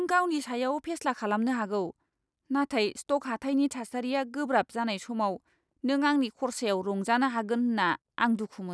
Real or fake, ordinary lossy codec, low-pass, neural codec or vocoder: real; none; none; none